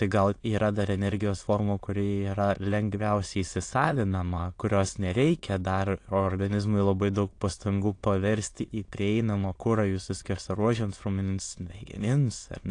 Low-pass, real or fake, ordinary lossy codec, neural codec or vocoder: 9.9 kHz; fake; AAC, 48 kbps; autoencoder, 22.05 kHz, a latent of 192 numbers a frame, VITS, trained on many speakers